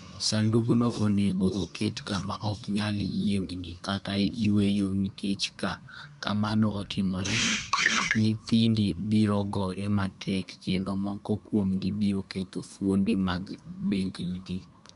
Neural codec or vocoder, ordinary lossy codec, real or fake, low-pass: codec, 24 kHz, 1 kbps, SNAC; none; fake; 10.8 kHz